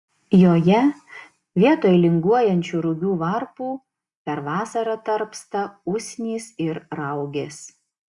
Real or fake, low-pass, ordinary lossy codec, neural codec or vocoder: real; 10.8 kHz; Opus, 64 kbps; none